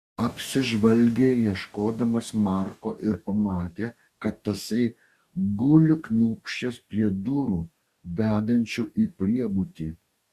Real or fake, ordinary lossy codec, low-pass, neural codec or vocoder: fake; Opus, 64 kbps; 14.4 kHz; codec, 44.1 kHz, 2.6 kbps, DAC